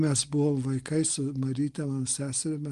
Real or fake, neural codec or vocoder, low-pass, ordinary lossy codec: real; none; 10.8 kHz; Opus, 24 kbps